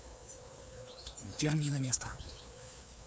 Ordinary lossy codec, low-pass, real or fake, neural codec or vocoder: none; none; fake; codec, 16 kHz, 2 kbps, FreqCodec, larger model